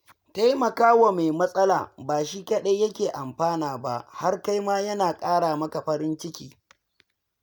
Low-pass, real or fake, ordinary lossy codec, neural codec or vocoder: none; real; none; none